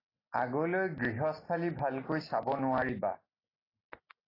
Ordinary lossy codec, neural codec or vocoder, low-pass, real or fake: AAC, 24 kbps; none; 5.4 kHz; real